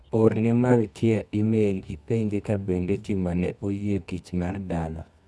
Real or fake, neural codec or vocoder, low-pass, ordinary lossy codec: fake; codec, 24 kHz, 0.9 kbps, WavTokenizer, medium music audio release; none; none